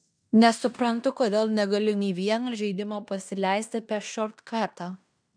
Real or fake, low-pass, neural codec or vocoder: fake; 9.9 kHz; codec, 16 kHz in and 24 kHz out, 0.9 kbps, LongCat-Audio-Codec, fine tuned four codebook decoder